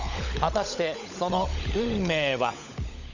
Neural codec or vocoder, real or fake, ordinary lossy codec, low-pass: codec, 16 kHz, 16 kbps, FunCodec, trained on LibriTTS, 50 frames a second; fake; none; 7.2 kHz